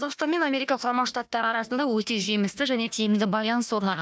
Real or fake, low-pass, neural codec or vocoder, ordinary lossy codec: fake; none; codec, 16 kHz, 1 kbps, FunCodec, trained on Chinese and English, 50 frames a second; none